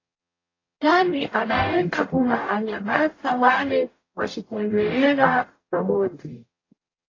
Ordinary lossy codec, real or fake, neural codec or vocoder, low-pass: AAC, 32 kbps; fake; codec, 44.1 kHz, 0.9 kbps, DAC; 7.2 kHz